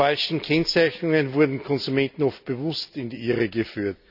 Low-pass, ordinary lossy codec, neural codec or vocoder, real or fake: 5.4 kHz; none; none; real